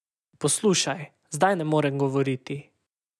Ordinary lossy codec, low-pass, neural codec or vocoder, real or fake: none; none; none; real